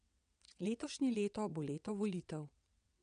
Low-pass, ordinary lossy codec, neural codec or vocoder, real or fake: 9.9 kHz; none; vocoder, 22.05 kHz, 80 mel bands, WaveNeXt; fake